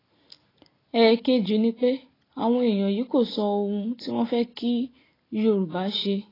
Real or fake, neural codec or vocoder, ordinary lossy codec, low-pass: real; none; AAC, 24 kbps; 5.4 kHz